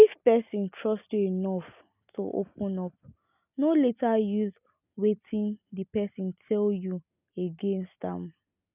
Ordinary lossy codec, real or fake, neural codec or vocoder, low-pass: none; real; none; 3.6 kHz